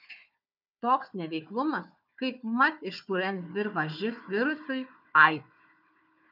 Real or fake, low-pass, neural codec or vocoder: fake; 5.4 kHz; codec, 16 kHz, 4 kbps, FunCodec, trained on Chinese and English, 50 frames a second